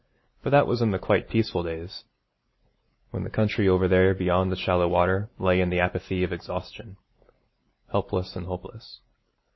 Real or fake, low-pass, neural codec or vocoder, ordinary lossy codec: real; 7.2 kHz; none; MP3, 24 kbps